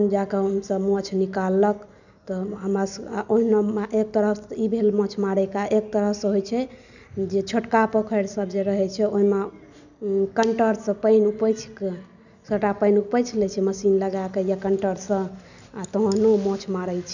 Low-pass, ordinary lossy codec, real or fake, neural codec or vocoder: 7.2 kHz; none; real; none